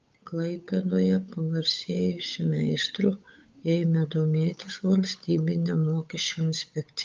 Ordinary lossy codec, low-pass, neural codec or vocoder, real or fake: Opus, 24 kbps; 7.2 kHz; codec, 16 kHz, 8 kbps, FunCodec, trained on Chinese and English, 25 frames a second; fake